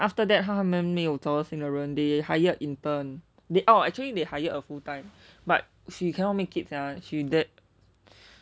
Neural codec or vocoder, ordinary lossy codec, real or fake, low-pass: none; none; real; none